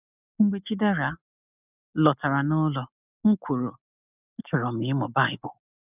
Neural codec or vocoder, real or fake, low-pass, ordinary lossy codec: none; real; 3.6 kHz; none